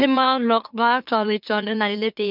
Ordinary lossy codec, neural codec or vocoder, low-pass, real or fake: none; autoencoder, 44.1 kHz, a latent of 192 numbers a frame, MeloTTS; 5.4 kHz; fake